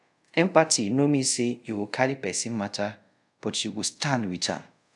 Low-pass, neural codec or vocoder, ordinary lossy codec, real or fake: 10.8 kHz; codec, 24 kHz, 0.5 kbps, DualCodec; none; fake